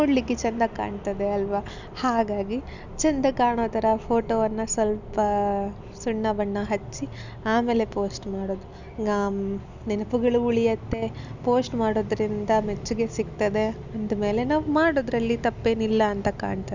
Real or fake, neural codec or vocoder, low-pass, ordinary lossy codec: real; none; 7.2 kHz; none